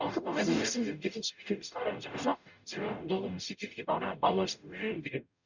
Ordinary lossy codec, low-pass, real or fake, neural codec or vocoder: none; 7.2 kHz; fake; codec, 44.1 kHz, 0.9 kbps, DAC